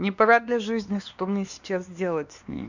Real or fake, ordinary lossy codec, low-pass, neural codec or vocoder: fake; none; 7.2 kHz; codec, 16 kHz, 2 kbps, X-Codec, WavLM features, trained on Multilingual LibriSpeech